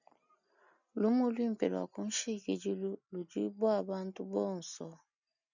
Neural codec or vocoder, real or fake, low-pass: none; real; 7.2 kHz